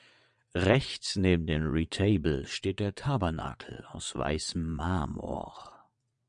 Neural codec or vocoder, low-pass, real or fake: vocoder, 22.05 kHz, 80 mel bands, WaveNeXt; 9.9 kHz; fake